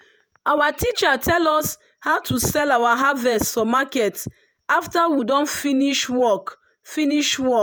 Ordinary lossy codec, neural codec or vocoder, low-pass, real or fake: none; vocoder, 48 kHz, 128 mel bands, Vocos; none; fake